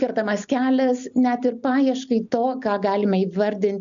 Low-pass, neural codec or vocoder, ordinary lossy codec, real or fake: 7.2 kHz; none; MP3, 96 kbps; real